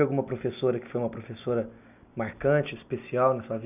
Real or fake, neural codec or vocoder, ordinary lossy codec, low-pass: real; none; none; 3.6 kHz